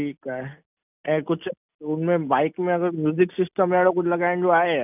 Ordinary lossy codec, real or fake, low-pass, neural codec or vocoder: none; real; 3.6 kHz; none